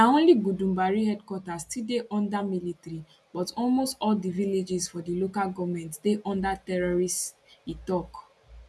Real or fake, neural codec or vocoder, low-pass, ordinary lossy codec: real; none; none; none